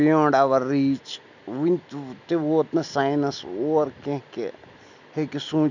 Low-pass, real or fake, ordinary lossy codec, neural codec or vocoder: 7.2 kHz; real; none; none